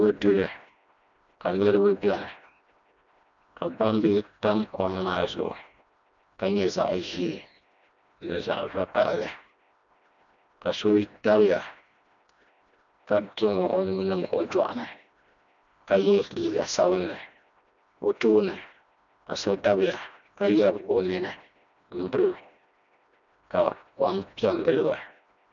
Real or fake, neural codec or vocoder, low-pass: fake; codec, 16 kHz, 1 kbps, FreqCodec, smaller model; 7.2 kHz